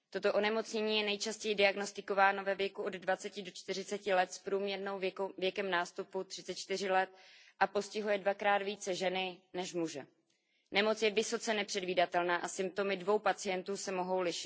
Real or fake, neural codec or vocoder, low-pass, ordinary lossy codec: real; none; none; none